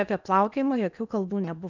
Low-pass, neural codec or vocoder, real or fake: 7.2 kHz; codec, 16 kHz in and 24 kHz out, 0.8 kbps, FocalCodec, streaming, 65536 codes; fake